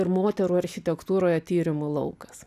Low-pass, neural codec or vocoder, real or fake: 14.4 kHz; none; real